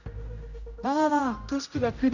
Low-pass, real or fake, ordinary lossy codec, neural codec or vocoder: 7.2 kHz; fake; none; codec, 16 kHz, 0.5 kbps, X-Codec, HuBERT features, trained on general audio